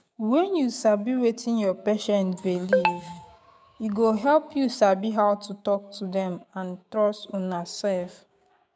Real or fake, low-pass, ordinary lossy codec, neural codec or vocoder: fake; none; none; codec, 16 kHz, 6 kbps, DAC